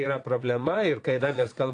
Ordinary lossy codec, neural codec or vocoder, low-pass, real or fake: AAC, 48 kbps; vocoder, 22.05 kHz, 80 mel bands, Vocos; 9.9 kHz; fake